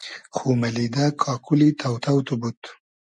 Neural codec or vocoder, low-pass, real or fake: none; 10.8 kHz; real